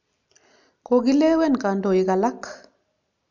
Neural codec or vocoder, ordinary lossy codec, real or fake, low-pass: none; none; real; 7.2 kHz